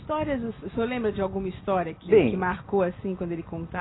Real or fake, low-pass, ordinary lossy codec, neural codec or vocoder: real; 7.2 kHz; AAC, 16 kbps; none